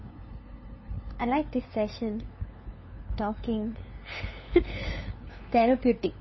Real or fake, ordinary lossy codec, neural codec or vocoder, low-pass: fake; MP3, 24 kbps; codec, 16 kHz, 2 kbps, FunCodec, trained on LibriTTS, 25 frames a second; 7.2 kHz